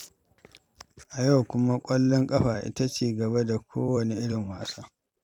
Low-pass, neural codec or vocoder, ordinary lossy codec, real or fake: 19.8 kHz; vocoder, 44.1 kHz, 128 mel bands every 256 samples, BigVGAN v2; none; fake